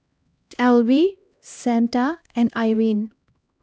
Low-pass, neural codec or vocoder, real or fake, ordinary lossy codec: none; codec, 16 kHz, 1 kbps, X-Codec, HuBERT features, trained on LibriSpeech; fake; none